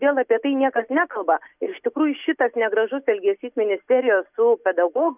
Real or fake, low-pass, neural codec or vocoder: real; 3.6 kHz; none